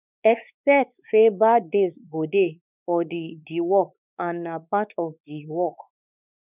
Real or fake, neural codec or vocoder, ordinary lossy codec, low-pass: fake; codec, 16 kHz, 4 kbps, X-Codec, WavLM features, trained on Multilingual LibriSpeech; none; 3.6 kHz